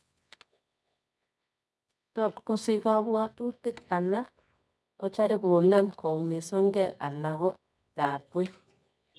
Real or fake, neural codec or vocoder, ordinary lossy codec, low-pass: fake; codec, 24 kHz, 0.9 kbps, WavTokenizer, medium music audio release; none; none